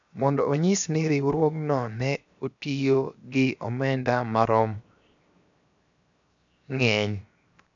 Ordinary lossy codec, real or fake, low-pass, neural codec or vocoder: none; fake; 7.2 kHz; codec, 16 kHz, 0.7 kbps, FocalCodec